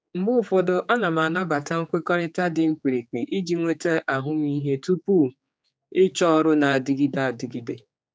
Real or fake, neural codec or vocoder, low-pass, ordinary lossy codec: fake; codec, 16 kHz, 4 kbps, X-Codec, HuBERT features, trained on general audio; none; none